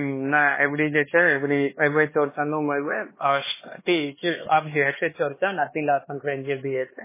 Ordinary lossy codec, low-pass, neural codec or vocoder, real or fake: MP3, 16 kbps; 3.6 kHz; codec, 16 kHz, 2 kbps, X-Codec, HuBERT features, trained on LibriSpeech; fake